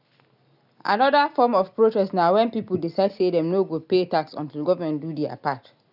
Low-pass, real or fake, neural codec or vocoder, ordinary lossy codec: 5.4 kHz; real; none; none